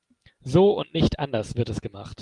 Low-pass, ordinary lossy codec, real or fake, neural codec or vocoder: 10.8 kHz; Opus, 32 kbps; real; none